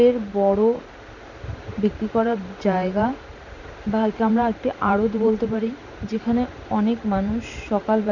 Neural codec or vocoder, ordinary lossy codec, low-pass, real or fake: vocoder, 44.1 kHz, 128 mel bands every 512 samples, BigVGAN v2; none; 7.2 kHz; fake